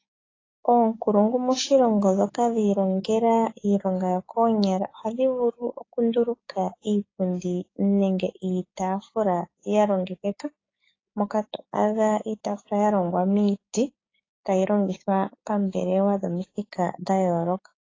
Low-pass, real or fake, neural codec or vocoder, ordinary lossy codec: 7.2 kHz; fake; codec, 16 kHz, 6 kbps, DAC; AAC, 32 kbps